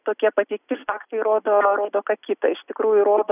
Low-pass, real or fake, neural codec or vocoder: 3.6 kHz; real; none